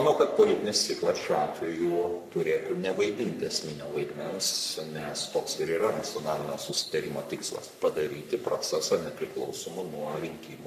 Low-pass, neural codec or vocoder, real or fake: 14.4 kHz; codec, 44.1 kHz, 3.4 kbps, Pupu-Codec; fake